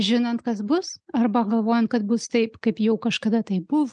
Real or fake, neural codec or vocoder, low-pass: real; none; 9.9 kHz